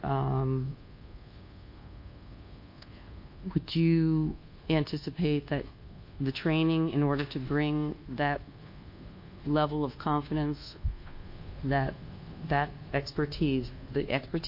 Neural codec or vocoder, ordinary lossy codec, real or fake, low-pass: codec, 24 kHz, 1.2 kbps, DualCodec; MP3, 32 kbps; fake; 5.4 kHz